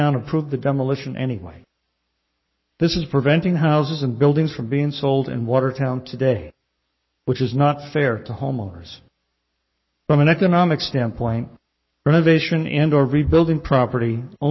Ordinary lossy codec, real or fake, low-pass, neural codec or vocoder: MP3, 24 kbps; fake; 7.2 kHz; codec, 44.1 kHz, 7.8 kbps, Pupu-Codec